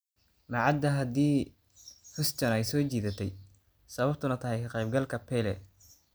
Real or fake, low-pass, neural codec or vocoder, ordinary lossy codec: real; none; none; none